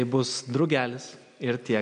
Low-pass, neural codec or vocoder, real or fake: 9.9 kHz; none; real